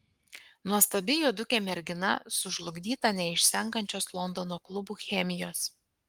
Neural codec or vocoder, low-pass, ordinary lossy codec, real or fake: codec, 44.1 kHz, 7.8 kbps, Pupu-Codec; 19.8 kHz; Opus, 32 kbps; fake